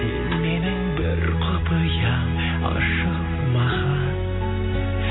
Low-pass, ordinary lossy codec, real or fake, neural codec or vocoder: 7.2 kHz; AAC, 16 kbps; real; none